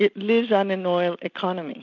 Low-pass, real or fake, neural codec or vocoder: 7.2 kHz; real; none